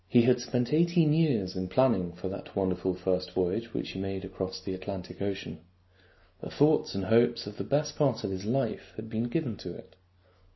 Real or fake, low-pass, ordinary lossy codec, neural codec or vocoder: real; 7.2 kHz; MP3, 24 kbps; none